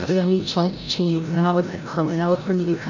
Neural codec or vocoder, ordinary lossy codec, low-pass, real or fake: codec, 16 kHz, 0.5 kbps, FreqCodec, larger model; none; 7.2 kHz; fake